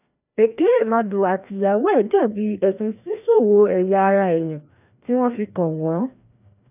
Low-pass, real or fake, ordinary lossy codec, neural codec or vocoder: 3.6 kHz; fake; none; codec, 16 kHz, 1 kbps, FreqCodec, larger model